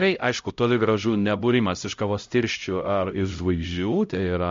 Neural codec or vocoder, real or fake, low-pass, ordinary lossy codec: codec, 16 kHz, 0.5 kbps, X-Codec, HuBERT features, trained on LibriSpeech; fake; 7.2 kHz; MP3, 48 kbps